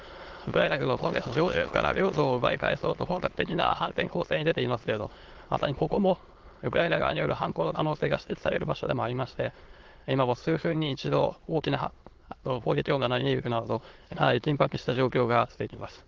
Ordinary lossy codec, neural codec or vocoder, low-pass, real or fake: Opus, 16 kbps; autoencoder, 22.05 kHz, a latent of 192 numbers a frame, VITS, trained on many speakers; 7.2 kHz; fake